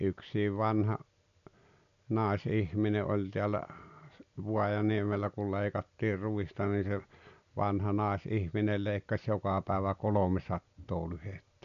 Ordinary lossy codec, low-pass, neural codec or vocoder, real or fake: none; 7.2 kHz; none; real